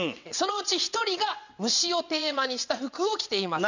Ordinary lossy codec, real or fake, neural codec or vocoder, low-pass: none; fake; vocoder, 22.05 kHz, 80 mel bands, WaveNeXt; 7.2 kHz